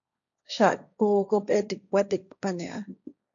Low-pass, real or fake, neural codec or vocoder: 7.2 kHz; fake; codec, 16 kHz, 1.1 kbps, Voila-Tokenizer